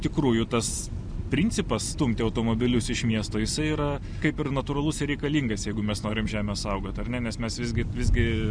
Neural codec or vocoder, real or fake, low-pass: none; real; 9.9 kHz